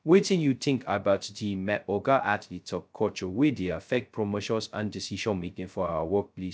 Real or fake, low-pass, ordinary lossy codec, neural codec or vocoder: fake; none; none; codec, 16 kHz, 0.2 kbps, FocalCodec